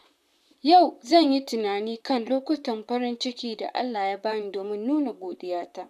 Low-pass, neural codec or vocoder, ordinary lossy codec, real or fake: 14.4 kHz; vocoder, 44.1 kHz, 128 mel bands, Pupu-Vocoder; none; fake